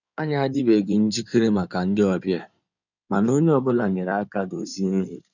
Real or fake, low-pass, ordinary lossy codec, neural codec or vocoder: fake; 7.2 kHz; none; codec, 16 kHz in and 24 kHz out, 2.2 kbps, FireRedTTS-2 codec